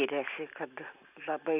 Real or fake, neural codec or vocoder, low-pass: real; none; 3.6 kHz